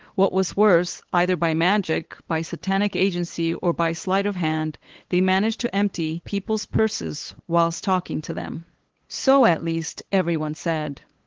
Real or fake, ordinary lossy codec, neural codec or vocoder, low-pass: fake; Opus, 16 kbps; codec, 16 kHz, 8 kbps, FunCodec, trained on LibriTTS, 25 frames a second; 7.2 kHz